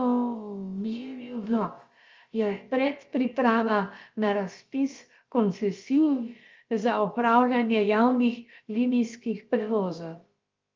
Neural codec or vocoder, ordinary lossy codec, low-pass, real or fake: codec, 16 kHz, about 1 kbps, DyCAST, with the encoder's durations; Opus, 32 kbps; 7.2 kHz; fake